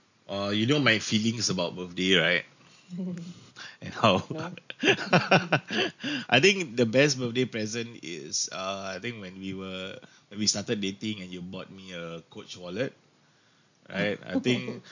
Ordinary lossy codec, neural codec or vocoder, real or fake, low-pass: none; none; real; 7.2 kHz